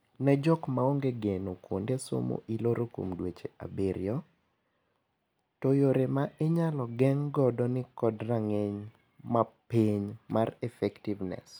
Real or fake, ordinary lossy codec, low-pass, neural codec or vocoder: real; none; none; none